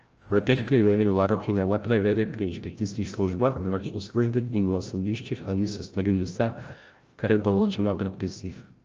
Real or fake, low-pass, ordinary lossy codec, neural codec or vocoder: fake; 7.2 kHz; Opus, 24 kbps; codec, 16 kHz, 0.5 kbps, FreqCodec, larger model